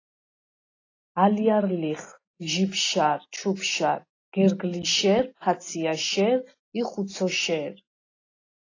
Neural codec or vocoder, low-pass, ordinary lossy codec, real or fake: none; 7.2 kHz; AAC, 32 kbps; real